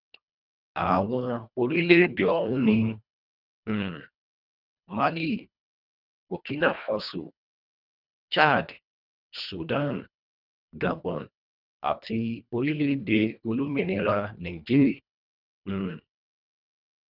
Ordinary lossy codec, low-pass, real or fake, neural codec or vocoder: none; 5.4 kHz; fake; codec, 24 kHz, 1.5 kbps, HILCodec